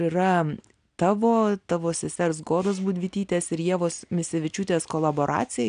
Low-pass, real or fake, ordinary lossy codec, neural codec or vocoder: 9.9 kHz; real; AAC, 96 kbps; none